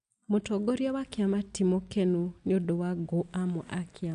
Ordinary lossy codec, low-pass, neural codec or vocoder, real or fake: none; 10.8 kHz; none; real